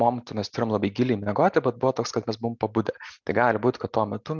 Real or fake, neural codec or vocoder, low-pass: real; none; 7.2 kHz